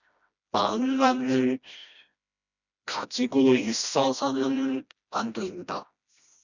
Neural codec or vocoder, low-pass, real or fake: codec, 16 kHz, 1 kbps, FreqCodec, smaller model; 7.2 kHz; fake